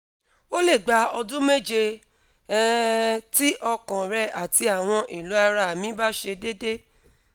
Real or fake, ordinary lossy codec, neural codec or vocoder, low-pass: real; none; none; none